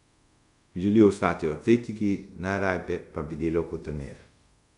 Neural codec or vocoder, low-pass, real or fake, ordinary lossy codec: codec, 24 kHz, 0.5 kbps, DualCodec; 10.8 kHz; fake; none